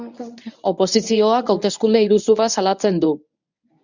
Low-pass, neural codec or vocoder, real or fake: 7.2 kHz; codec, 24 kHz, 0.9 kbps, WavTokenizer, medium speech release version 1; fake